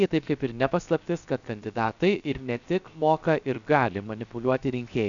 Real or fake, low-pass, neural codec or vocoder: fake; 7.2 kHz; codec, 16 kHz, 0.7 kbps, FocalCodec